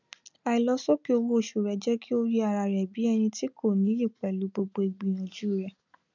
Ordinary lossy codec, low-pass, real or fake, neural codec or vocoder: none; 7.2 kHz; real; none